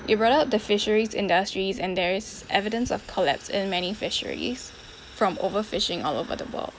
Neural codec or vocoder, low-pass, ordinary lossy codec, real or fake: none; none; none; real